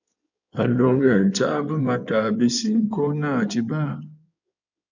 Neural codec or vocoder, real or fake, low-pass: codec, 16 kHz in and 24 kHz out, 2.2 kbps, FireRedTTS-2 codec; fake; 7.2 kHz